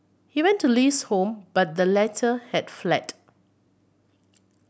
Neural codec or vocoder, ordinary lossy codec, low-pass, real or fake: none; none; none; real